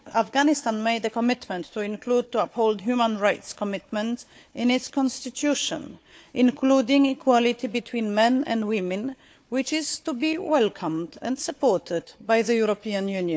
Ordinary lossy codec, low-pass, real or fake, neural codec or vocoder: none; none; fake; codec, 16 kHz, 4 kbps, FunCodec, trained on Chinese and English, 50 frames a second